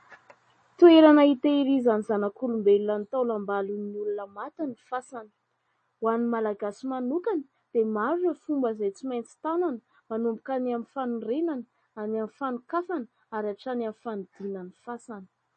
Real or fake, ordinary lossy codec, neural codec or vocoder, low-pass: real; MP3, 32 kbps; none; 10.8 kHz